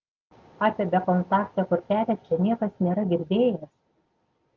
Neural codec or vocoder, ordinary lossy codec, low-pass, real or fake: none; Opus, 24 kbps; 7.2 kHz; real